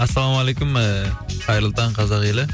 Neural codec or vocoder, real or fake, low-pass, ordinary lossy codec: none; real; none; none